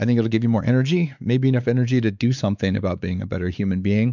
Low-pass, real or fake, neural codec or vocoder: 7.2 kHz; fake; codec, 16 kHz, 4 kbps, X-Codec, WavLM features, trained on Multilingual LibriSpeech